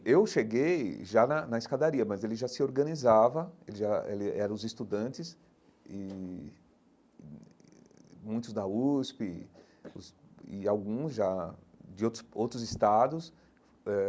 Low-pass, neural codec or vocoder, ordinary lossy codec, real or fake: none; none; none; real